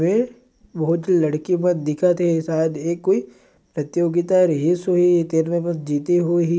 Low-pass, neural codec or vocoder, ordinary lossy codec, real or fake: none; none; none; real